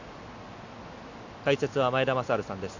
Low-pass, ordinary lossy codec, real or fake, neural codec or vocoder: 7.2 kHz; Opus, 64 kbps; real; none